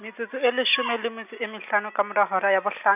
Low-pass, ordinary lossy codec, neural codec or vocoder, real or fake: 3.6 kHz; none; none; real